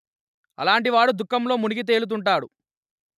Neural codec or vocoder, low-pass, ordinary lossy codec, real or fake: none; 14.4 kHz; none; real